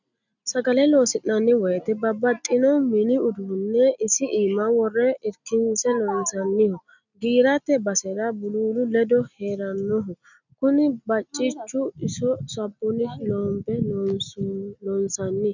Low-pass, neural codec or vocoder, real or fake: 7.2 kHz; none; real